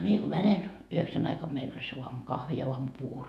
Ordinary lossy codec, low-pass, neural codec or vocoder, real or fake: none; 14.4 kHz; autoencoder, 48 kHz, 128 numbers a frame, DAC-VAE, trained on Japanese speech; fake